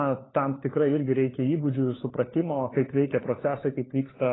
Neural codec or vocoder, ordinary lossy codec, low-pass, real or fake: codec, 16 kHz, 4 kbps, FunCodec, trained on LibriTTS, 50 frames a second; AAC, 16 kbps; 7.2 kHz; fake